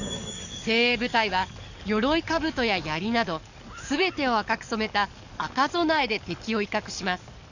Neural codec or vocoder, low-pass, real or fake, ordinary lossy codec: codec, 44.1 kHz, 7.8 kbps, DAC; 7.2 kHz; fake; none